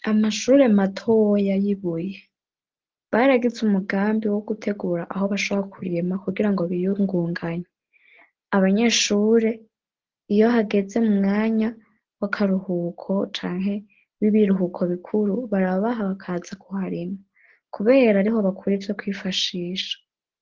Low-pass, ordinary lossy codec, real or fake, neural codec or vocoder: 7.2 kHz; Opus, 16 kbps; real; none